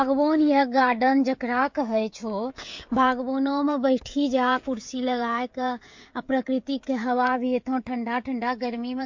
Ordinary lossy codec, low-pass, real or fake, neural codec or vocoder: MP3, 48 kbps; 7.2 kHz; fake; codec, 16 kHz, 16 kbps, FreqCodec, smaller model